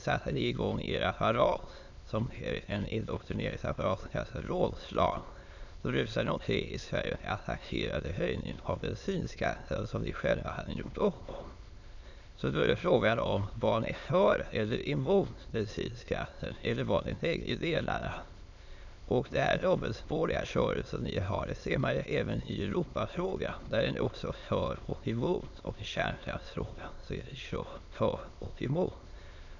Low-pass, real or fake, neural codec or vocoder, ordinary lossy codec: 7.2 kHz; fake; autoencoder, 22.05 kHz, a latent of 192 numbers a frame, VITS, trained on many speakers; none